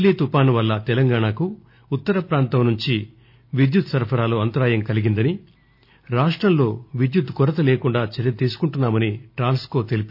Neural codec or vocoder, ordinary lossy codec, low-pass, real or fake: none; none; 5.4 kHz; real